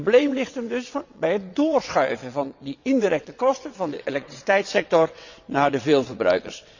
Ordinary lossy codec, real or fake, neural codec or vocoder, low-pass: none; fake; vocoder, 22.05 kHz, 80 mel bands, WaveNeXt; 7.2 kHz